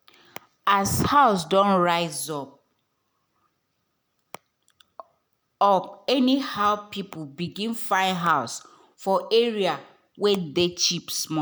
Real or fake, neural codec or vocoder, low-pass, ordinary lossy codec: real; none; none; none